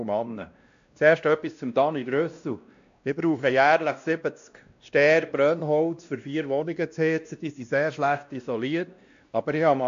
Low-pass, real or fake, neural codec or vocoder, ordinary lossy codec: 7.2 kHz; fake; codec, 16 kHz, 1 kbps, X-Codec, WavLM features, trained on Multilingual LibriSpeech; AAC, 64 kbps